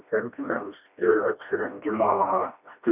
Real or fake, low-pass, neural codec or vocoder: fake; 3.6 kHz; codec, 16 kHz, 1 kbps, FreqCodec, smaller model